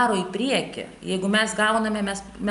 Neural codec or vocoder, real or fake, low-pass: none; real; 10.8 kHz